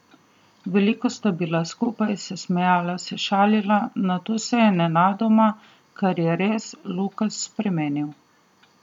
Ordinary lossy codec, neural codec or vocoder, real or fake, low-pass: none; none; real; 19.8 kHz